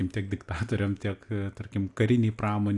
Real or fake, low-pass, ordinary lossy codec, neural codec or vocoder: real; 10.8 kHz; AAC, 64 kbps; none